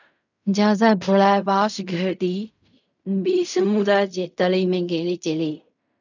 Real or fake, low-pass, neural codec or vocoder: fake; 7.2 kHz; codec, 16 kHz in and 24 kHz out, 0.4 kbps, LongCat-Audio-Codec, fine tuned four codebook decoder